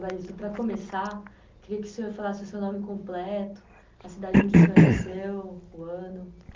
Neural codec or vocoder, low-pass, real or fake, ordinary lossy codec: none; 7.2 kHz; real; Opus, 32 kbps